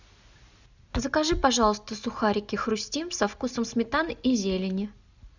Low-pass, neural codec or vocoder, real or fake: 7.2 kHz; none; real